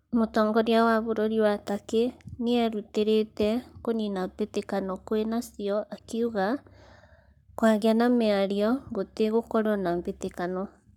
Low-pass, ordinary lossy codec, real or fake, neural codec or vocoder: 19.8 kHz; none; fake; codec, 44.1 kHz, 7.8 kbps, Pupu-Codec